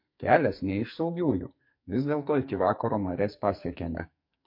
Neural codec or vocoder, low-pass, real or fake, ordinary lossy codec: codec, 44.1 kHz, 2.6 kbps, SNAC; 5.4 kHz; fake; MP3, 32 kbps